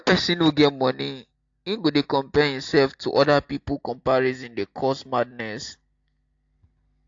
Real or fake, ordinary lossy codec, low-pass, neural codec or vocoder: real; AAC, 48 kbps; 7.2 kHz; none